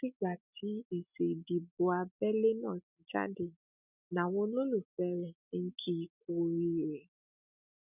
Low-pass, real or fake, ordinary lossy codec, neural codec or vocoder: 3.6 kHz; real; none; none